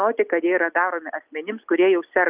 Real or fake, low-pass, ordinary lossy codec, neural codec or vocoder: real; 3.6 kHz; Opus, 24 kbps; none